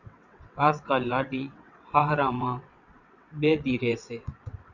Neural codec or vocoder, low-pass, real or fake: vocoder, 22.05 kHz, 80 mel bands, WaveNeXt; 7.2 kHz; fake